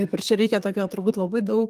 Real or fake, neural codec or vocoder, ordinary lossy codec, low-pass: fake; codec, 32 kHz, 1.9 kbps, SNAC; Opus, 32 kbps; 14.4 kHz